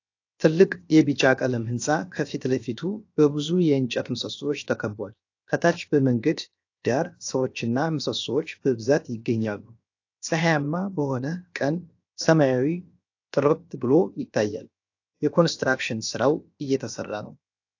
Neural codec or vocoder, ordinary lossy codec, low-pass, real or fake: codec, 16 kHz, 0.7 kbps, FocalCodec; AAC, 48 kbps; 7.2 kHz; fake